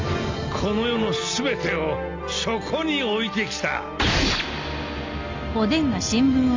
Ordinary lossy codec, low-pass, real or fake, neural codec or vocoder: MP3, 64 kbps; 7.2 kHz; real; none